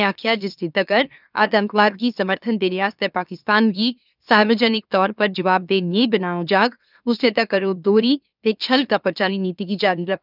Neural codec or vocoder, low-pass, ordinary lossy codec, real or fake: autoencoder, 44.1 kHz, a latent of 192 numbers a frame, MeloTTS; 5.4 kHz; none; fake